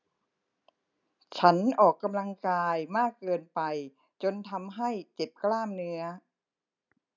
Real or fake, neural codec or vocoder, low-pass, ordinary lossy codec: real; none; 7.2 kHz; none